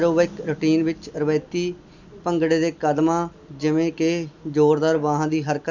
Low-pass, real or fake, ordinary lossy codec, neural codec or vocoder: 7.2 kHz; real; none; none